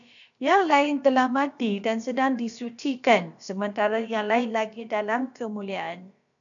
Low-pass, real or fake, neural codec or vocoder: 7.2 kHz; fake; codec, 16 kHz, about 1 kbps, DyCAST, with the encoder's durations